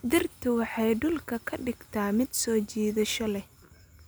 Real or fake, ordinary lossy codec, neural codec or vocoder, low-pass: real; none; none; none